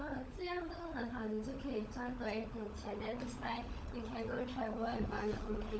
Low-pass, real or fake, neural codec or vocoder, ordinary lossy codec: none; fake; codec, 16 kHz, 16 kbps, FunCodec, trained on LibriTTS, 50 frames a second; none